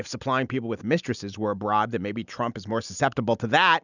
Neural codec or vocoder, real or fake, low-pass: none; real; 7.2 kHz